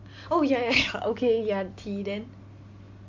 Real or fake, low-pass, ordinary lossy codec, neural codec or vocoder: real; 7.2 kHz; MP3, 48 kbps; none